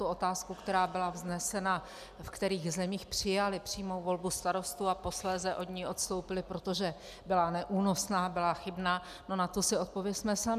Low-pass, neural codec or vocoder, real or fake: 14.4 kHz; none; real